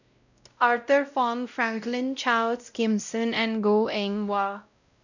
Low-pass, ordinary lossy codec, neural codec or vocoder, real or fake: 7.2 kHz; none; codec, 16 kHz, 0.5 kbps, X-Codec, WavLM features, trained on Multilingual LibriSpeech; fake